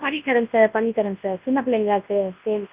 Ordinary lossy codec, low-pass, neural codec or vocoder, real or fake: Opus, 24 kbps; 3.6 kHz; codec, 24 kHz, 0.9 kbps, WavTokenizer, large speech release; fake